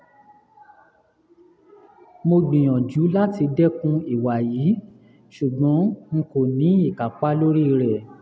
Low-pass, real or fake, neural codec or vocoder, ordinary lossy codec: none; real; none; none